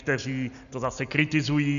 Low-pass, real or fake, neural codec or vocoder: 7.2 kHz; real; none